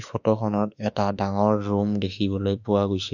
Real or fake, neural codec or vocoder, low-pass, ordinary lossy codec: fake; autoencoder, 48 kHz, 32 numbers a frame, DAC-VAE, trained on Japanese speech; 7.2 kHz; none